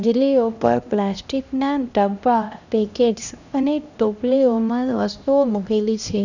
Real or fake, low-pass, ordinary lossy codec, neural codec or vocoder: fake; 7.2 kHz; none; codec, 16 kHz, 1 kbps, X-Codec, HuBERT features, trained on LibriSpeech